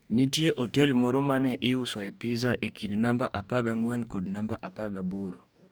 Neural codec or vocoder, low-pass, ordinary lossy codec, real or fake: codec, 44.1 kHz, 2.6 kbps, DAC; none; none; fake